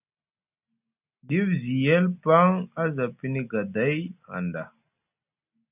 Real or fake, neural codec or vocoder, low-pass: real; none; 3.6 kHz